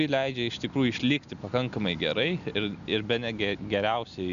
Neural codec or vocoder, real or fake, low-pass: none; real; 7.2 kHz